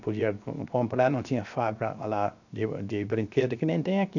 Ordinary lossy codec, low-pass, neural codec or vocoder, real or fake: none; 7.2 kHz; codec, 16 kHz, 0.7 kbps, FocalCodec; fake